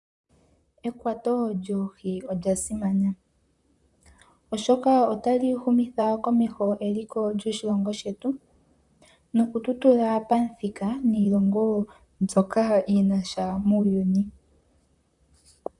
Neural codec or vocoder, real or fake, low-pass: vocoder, 44.1 kHz, 128 mel bands, Pupu-Vocoder; fake; 10.8 kHz